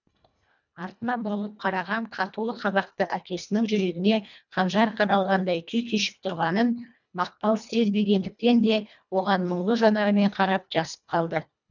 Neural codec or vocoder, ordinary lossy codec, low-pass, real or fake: codec, 24 kHz, 1.5 kbps, HILCodec; none; 7.2 kHz; fake